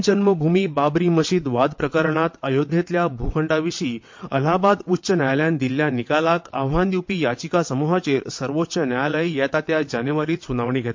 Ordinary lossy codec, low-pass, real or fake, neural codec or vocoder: MP3, 48 kbps; 7.2 kHz; fake; vocoder, 22.05 kHz, 80 mel bands, WaveNeXt